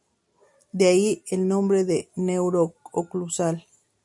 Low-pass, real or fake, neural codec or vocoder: 10.8 kHz; real; none